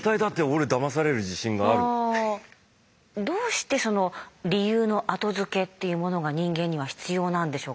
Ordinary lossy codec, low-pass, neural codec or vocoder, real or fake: none; none; none; real